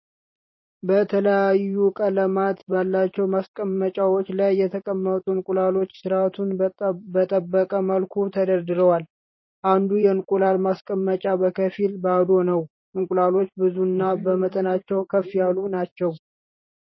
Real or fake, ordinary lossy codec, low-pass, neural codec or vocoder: fake; MP3, 24 kbps; 7.2 kHz; vocoder, 44.1 kHz, 128 mel bands every 512 samples, BigVGAN v2